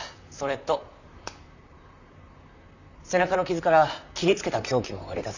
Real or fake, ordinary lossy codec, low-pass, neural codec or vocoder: fake; none; 7.2 kHz; vocoder, 44.1 kHz, 128 mel bands, Pupu-Vocoder